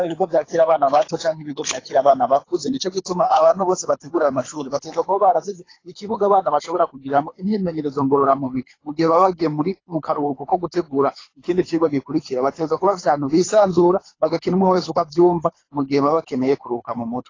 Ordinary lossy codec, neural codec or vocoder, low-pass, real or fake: AAC, 32 kbps; codec, 24 kHz, 3 kbps, HILCodec; 7.2 kHz; fake